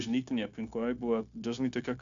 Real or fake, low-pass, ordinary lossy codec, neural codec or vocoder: fake; 7.2 kHz; AAC, 64 kbps; codec, 16 kHz, 0.9 kbps, LongCat-Audio-Codec